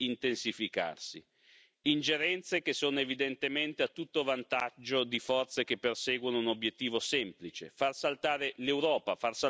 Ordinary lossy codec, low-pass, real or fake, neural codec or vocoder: none; none; real; none